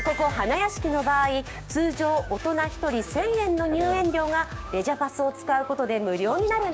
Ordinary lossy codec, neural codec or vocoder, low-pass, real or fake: none; codec, 16 kHz, 6 kbps, DAC; none; fake